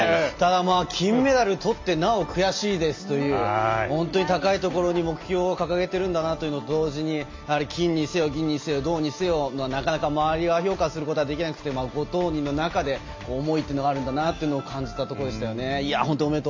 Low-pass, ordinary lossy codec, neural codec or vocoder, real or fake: 7.2 kHz; MP3, 48 kbps; none; real